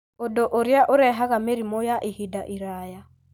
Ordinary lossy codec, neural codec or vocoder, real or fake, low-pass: none; none; real; none